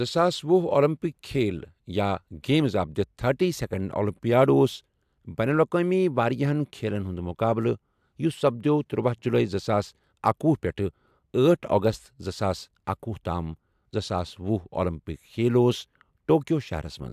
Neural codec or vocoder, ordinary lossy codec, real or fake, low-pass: none; AAC, 96 kbps; real; 14.4 kHz